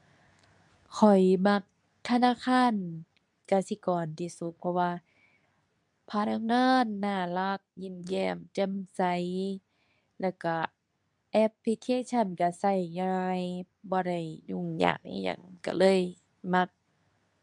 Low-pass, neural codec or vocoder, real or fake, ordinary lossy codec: 10.8 kHz; codec, 24 kHz, 0.9 kbps, WavTokenizer, medium speech release version 2; fake; none